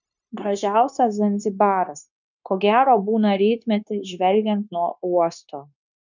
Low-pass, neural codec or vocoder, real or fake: 7.2 kHz; codec, 16 kHz, 0.9 kbps, LongCat-Audio-Codec; fake